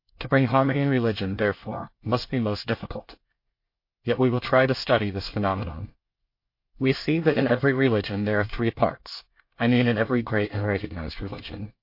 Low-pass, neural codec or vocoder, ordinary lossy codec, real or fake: 5.4 kHz; codec, 24 kHz, 1 kbps, SNAC; MP3, 32 kbps; fake